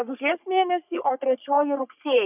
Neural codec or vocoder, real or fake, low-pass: codec, 44.1 kHz, 3.4 kbps, Pupu-Codec; fake; 3.6 kHz